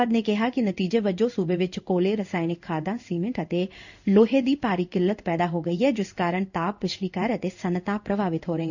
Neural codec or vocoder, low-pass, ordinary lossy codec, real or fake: codec, 16 kHz in and 24 kHz out, 1 kbps, XY-Tokenizer; 7.2 kHz; none; fake